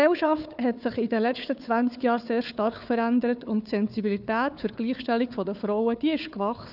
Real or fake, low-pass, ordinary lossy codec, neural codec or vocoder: fake; 5.4 kHz; none; codec, 16 kHz, 4 kbps, FunCodec, trained on Chinese and English, 50 frames a second